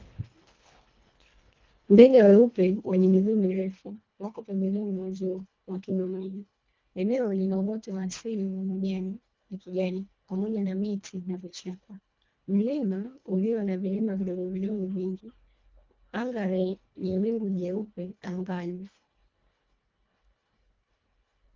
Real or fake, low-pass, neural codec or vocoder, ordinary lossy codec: fake; 7.2 kHz; codec, 24 kHz, 1.5 kbps, HILCodec; Opus, 32 kbps